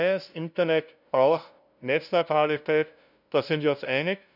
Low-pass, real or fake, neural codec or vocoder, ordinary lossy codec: 5.4 kHz; fake; codec, 16 kHz, 0.5 kbps, FunCodec, trained on LibriTTS, 25 frames a second; none